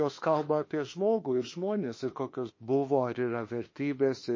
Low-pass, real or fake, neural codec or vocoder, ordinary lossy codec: 7.2 kHz; fake; autoencoder, 48 kHz, 32 numbers a frame, DAC-VAE, trained on Japanese speech; MP3, 32 kbps